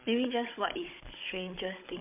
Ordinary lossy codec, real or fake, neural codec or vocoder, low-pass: MP3, 32 kbps; fake; codec, 16 kHz, 16 kbps, FunCodec, trained on Chinese and English, 50 frames a second; 3.6 kHz